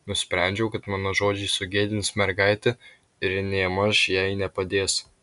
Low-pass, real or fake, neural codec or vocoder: 10.8 kHz; real; none